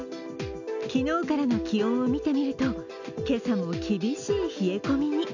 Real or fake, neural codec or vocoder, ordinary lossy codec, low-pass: real; none; none; 7.2 kHz